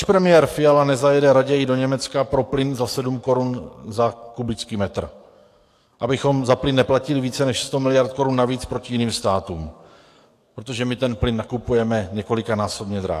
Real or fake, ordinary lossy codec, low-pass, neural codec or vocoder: fake; AAC, 64 kbps; 14.4 kHz; codec, 44.1 kHz, 7.8 kbps, DAC